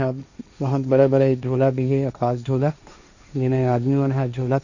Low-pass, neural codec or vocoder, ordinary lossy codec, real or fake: 7.2 kHz; codec, 16 kHz, 1.1 kbps, Voila-Tokenizer; none; fake